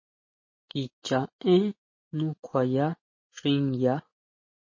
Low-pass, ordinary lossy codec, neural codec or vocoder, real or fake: 7.2 kHz; MP3, 32 kbps; none; real